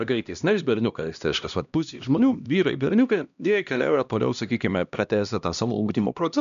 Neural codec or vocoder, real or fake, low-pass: codec, 16 kHz, 1 kbps, X-Codec, HuBERT features, trained on LibriSpeech; fake; 7.2 kHz